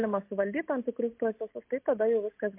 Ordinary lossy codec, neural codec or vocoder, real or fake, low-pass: MP3, 32 kbps; none; real; 3.6 kHz